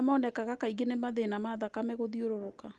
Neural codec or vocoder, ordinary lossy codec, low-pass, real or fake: none; Opus, 24 kbps; 10.8 kHz; real